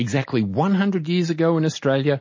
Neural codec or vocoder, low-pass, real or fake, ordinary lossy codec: none; 7.2 kHz; real; MP3, 32 kbps